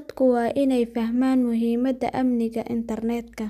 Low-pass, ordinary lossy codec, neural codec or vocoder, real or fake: 14.4 kHz; none; none; real